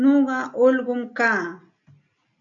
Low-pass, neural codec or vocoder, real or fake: 7.2 kHz; none; real